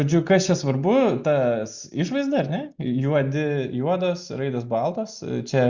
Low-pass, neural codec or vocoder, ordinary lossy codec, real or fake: 7.2 kHz; none; Opus, 64 kbps; real